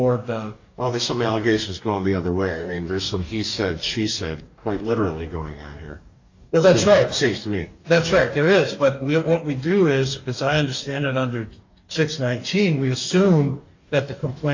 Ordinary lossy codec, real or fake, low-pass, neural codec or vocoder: AAC, 48 kbps; fake; 7.2 kHz; codec, 44.1 kHz, 2.6 kbps, DAC